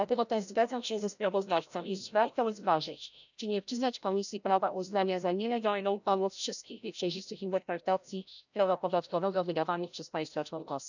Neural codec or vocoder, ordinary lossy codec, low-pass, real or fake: codec, 16 kHz, 0.5 kbps, FreqCodec, larger model; none; 7.2 kHz; fake